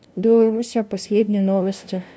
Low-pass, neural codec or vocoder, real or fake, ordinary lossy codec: none; codec, 16 kHz, 0.5 kbps, FunCodec, trained on LibriTTS, 25 frames a second; fake; none